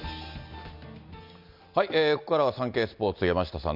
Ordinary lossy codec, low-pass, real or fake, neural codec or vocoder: none; 5.4 kHz; real; none